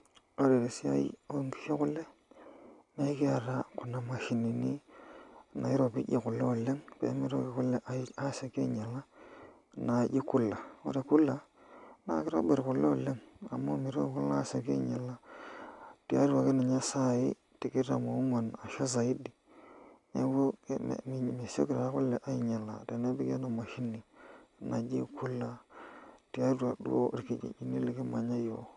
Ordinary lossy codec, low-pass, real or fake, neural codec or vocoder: none; 10.8 kHz; real; none